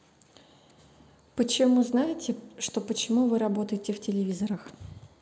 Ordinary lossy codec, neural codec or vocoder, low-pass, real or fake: none; none; none; real